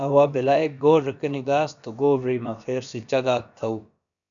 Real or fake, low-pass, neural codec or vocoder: fake; 7.2 kHz; codec, 16 kHz, about 1 kbps, DyCAST, with the encoder's durations